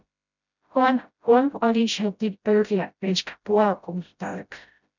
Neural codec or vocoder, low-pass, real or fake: codec, 16 kHz, 0.5 kbps, FreqCodec, smaller model; 7.2 kHz; fake